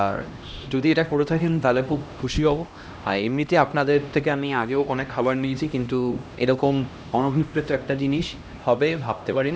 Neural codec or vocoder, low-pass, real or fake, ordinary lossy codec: codec, 16 kHz, 1 kbps, X-Codec, HuBERT features, trained on LibriSpeech; none; fake; none